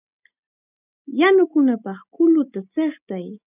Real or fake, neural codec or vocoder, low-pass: real; none; 3.6 kHz